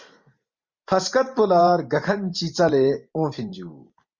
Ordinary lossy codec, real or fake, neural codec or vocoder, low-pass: Opus, 64 kbps; fake; vocoder, 44.1 kHz, 128 mel bands every 512 samples, BigVGAN v2; 7.2 kHz